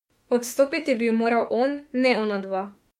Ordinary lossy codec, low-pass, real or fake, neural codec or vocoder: MP3, 64 kbps; 19.8 kHz; fake; autoencoder, 48 kHz, 32 numbers a frame, DAC-VAE, trained on Japanese speech